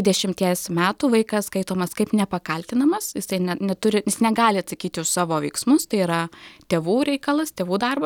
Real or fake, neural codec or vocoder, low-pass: real; none; 19.8 kHz